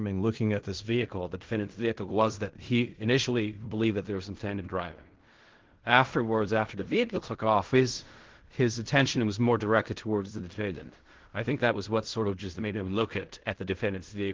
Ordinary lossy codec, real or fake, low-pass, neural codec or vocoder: Opus, 16 kbps; fake; 7.2 kHz; codec, 16 kHz in and 24 kHz out, 0.4 kbps, LongCat-Audio-Codec, fine tuned four codebook decoder